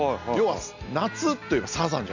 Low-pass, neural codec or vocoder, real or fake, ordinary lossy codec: 7.2 kHz; none; real; none